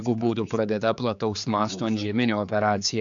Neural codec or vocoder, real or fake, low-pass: codec, 16 kHz, 4 kbps, X-Codec, HuBERT features, trained on general audio; fake; 7.2 kHz